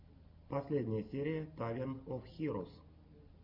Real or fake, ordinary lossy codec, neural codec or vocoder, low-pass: real; Opus, 64 kbps; none; 5.4 kHz